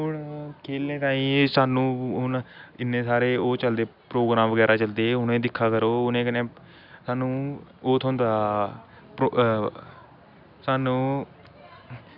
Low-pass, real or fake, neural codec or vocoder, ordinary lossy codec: 5.4 kHz; real; none; none